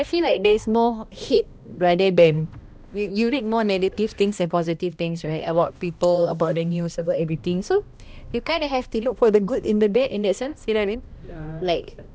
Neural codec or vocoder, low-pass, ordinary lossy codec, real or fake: codec, 16 kHz, 1 kbps, X-Codec, HuBERT features, trained on balanced general audio; none; none; fake